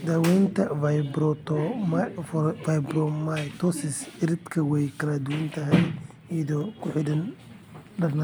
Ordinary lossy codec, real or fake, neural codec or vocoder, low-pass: none; real; none; none